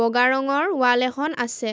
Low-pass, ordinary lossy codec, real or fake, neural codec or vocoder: none; none; real; none